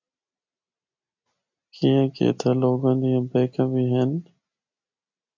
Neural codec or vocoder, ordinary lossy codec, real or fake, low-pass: none; MP3, 64 kbps; real; 7.2 kHz